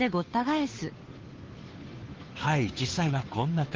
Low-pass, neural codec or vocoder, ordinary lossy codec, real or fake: 7.2 kHz; codec, 16 kHz, 2 kbps, FunCodec, trained on Chinese and English, 25 frames a second; Opus, 16 kbps; fake